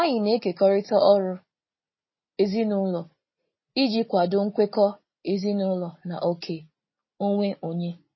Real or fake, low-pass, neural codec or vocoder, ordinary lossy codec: real; 7.2 kHz; none; MP3, 24 kbps